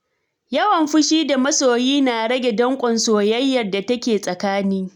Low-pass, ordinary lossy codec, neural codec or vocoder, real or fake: 19.8 kHz; none; none; real